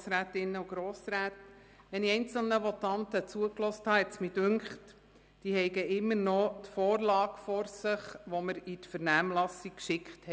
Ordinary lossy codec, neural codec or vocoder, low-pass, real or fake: none; none; none; real